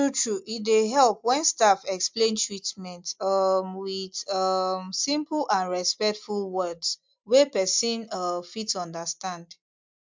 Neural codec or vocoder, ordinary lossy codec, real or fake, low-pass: none; MP3, 64 kbps; real; 7.2 kHz